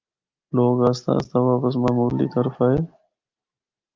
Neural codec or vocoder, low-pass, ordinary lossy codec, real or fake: none; 7.2 kHz; Opus, 24 kbps; real